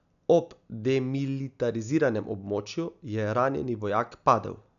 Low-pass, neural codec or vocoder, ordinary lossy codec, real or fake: 7.2 kHz; none; none; real